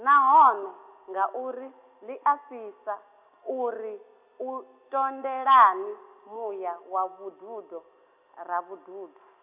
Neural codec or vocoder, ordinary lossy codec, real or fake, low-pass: none; none; real; 3.6 kHz